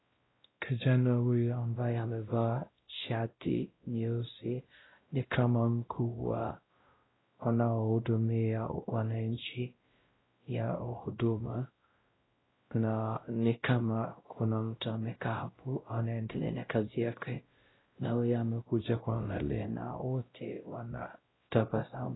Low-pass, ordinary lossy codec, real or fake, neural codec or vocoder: 7.2 kHz; AAC, 16 kbps; fake; codec, 16 kHz, 0.5 kbps, X-Codec, WavLM features, trained on Multilingual LibriSpeech